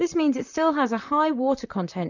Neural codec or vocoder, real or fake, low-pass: vocoder, 44.1 kHz, 128 mel bands, Pupu-Vocoder; fake; 7.2 kHz